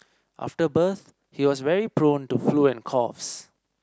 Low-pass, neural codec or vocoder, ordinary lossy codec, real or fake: none; none; none; real